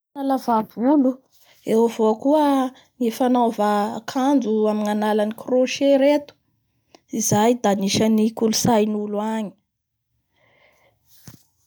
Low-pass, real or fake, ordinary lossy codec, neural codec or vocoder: none; real; none; none